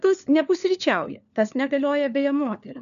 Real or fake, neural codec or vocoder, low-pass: fake; codec, 16 kHz, 4 kbps, FunCodec, trained on LibriTTS, 50 frames a second; 7.2 kHz